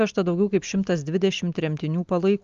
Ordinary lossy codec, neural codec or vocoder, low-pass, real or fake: Opus, 32 kbps; none; 7.2 kHz; real